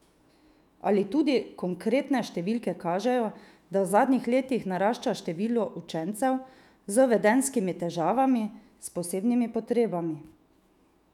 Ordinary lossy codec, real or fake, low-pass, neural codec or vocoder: none; fake; 19.8 kHz; autoencoder, 48 kHz, 128 numbers a frame, DAC-VAE, trained on Japanese speech